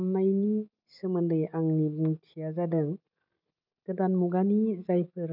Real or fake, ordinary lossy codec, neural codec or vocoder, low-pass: real; none; none; 5.4 kHz